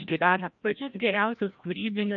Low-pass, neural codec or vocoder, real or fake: 7.2 kHz; codec, 16 kHz, 1 kbps, FreqCodec, larger model; fake